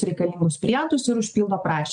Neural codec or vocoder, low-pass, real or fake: none; 9.9 kHz; real